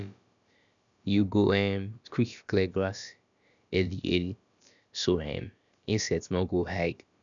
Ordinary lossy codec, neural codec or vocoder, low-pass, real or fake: none; codec, 16 kHz, about 1 kbps, DyCAST, with the encoder's durations; 7.2 kHz; fake